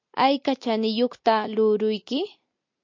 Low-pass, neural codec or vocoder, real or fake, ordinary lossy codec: 7.2 kHz; none; real; MP3, 48 kbps